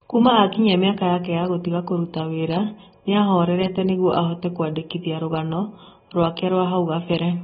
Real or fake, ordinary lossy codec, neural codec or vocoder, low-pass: real; AAC, 16 kbps; none; 10.8 kHz